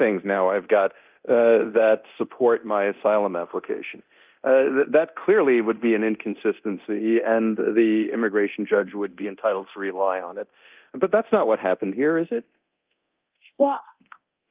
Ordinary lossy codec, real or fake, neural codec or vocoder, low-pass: Opus, 32 kbps; fake; codec, 24 kHz, 0.9 kbps, DualCodec; 3.6 kHz